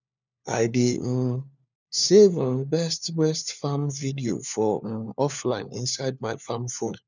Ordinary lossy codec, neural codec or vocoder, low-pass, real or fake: none; codec, 16 kHz, 4 kbps, FunCodec, trained on LibriTTS, 50 frames a second; 7.2 kHz; fake